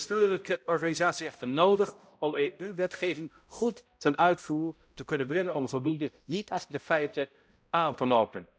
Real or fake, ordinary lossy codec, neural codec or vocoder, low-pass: fake; none; codec, 16 kHz, 0.5 kbps, X-Codec, HuBERT features, trained on balanced general audio; none